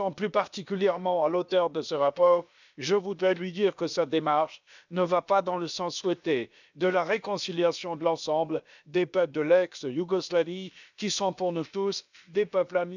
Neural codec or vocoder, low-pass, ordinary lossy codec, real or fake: codec, 16 kHz, about 1 kbps, DyCAST, with the encoder's durations; 7.2 kHz; none; fake